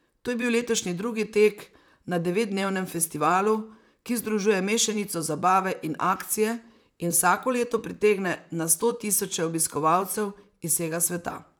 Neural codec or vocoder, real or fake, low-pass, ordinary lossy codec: vocoder, 44.1 kHz, 128 mel bands, Pupu-Vocoder; fake; none; none